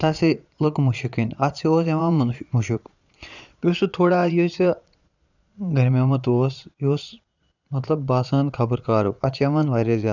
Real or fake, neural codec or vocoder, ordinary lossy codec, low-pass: fake; vocoder, 22.05 kHz, 80 mel bands, Vocos; none; 7.2 kHz